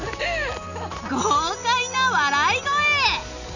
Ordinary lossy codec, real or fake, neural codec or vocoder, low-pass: none; real; none; 7.2 kHz